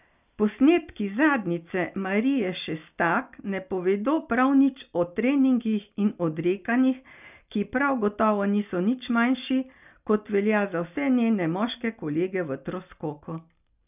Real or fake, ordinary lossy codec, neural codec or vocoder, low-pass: real; none; none; 3.6 kHz